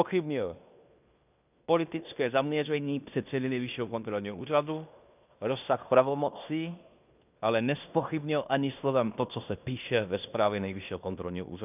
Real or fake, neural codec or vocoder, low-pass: fake; codec, 16 kHz in and 24 kHz out, 0.9 kbps, LongCat-Audio-Codec, fine tuned four codebook decoder; 3.6 kHz